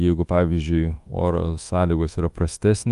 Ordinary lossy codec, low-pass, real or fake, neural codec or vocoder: Opus, 32 kbps; 10.8 kHz; fake; codec, 24 kHz, 1.2 kbps, DualCodec